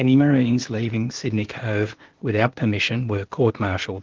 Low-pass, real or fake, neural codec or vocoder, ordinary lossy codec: 7.2 kHz; fake; codec, 16 kHz, 0.8 kbps, ZipCodec; Opus, 16 kbps